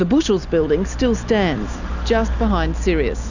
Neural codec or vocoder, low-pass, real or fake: none; 7.2 kHz; real